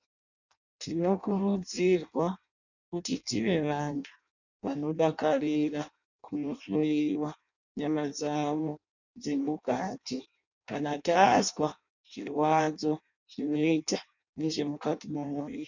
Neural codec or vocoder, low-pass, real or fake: codec, 16 kHz in and 24 kHz out, 0.6 kbps, FireRedTTS-2 codec; 7.2 kHz; fake